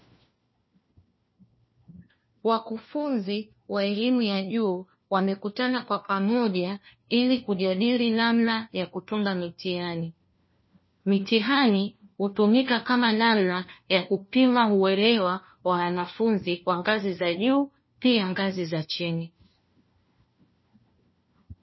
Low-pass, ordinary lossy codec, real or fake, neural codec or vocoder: 7.2 kHz; MP3, 24 kbps; fake; codec, 16 kHz, 1 kbps, FunCodec, trained on LibriTTS, 50 frames a second